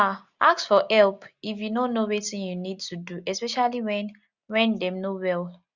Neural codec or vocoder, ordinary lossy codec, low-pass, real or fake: none; Opus, 64 kbps; 7.2 kHz; real